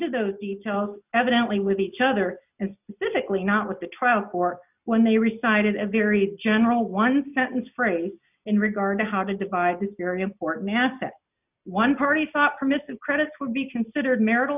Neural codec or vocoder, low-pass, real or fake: none; 3.6 kHz; real